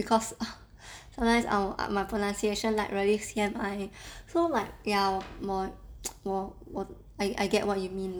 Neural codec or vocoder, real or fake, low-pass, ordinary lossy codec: none; real; none; none